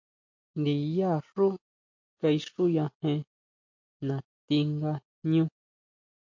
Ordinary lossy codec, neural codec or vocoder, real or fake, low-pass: MP3, 48 kbps; none; real; 7.2 kHz